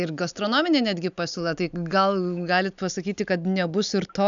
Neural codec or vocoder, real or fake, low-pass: none; real; 7.2 kHz